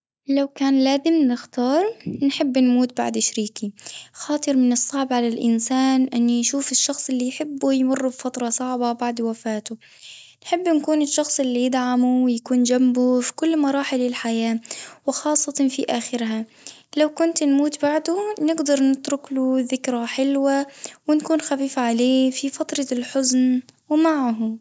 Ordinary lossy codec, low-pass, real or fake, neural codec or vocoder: none; none; real; none